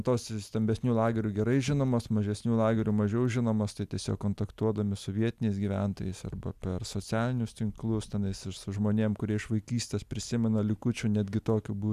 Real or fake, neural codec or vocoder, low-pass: real; none; 14.4 kHz